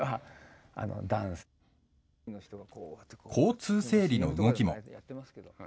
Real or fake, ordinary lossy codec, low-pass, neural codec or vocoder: real; none; none; none